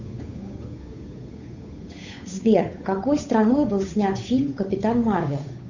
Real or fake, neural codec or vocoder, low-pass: fake; codec, 16 kHz, 8 kbps, FunCodec, trained on Chinese and English, 25 frames a second; 7.2 kHz